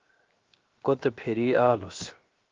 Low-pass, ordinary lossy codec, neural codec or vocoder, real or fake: 7.2 kHz; Opus, 32 kbps; codec, 16 kHz, 0.7 kbps, FocalCodec; fake